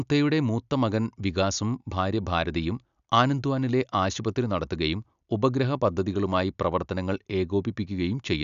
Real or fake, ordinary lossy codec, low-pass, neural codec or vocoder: real; none; 7.2 kHz; none